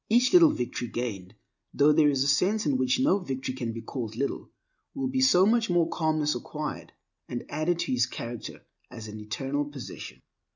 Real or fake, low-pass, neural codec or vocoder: real; 7.2 kHz; none